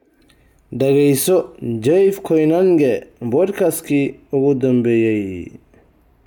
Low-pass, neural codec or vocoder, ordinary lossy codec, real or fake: 19.8 kHz; none; none; real